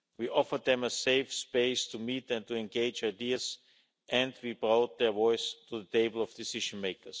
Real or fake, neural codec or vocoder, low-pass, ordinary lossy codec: real; none; none; none